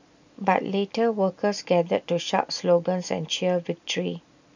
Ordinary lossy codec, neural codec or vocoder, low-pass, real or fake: none; none; 7.2 kHz; real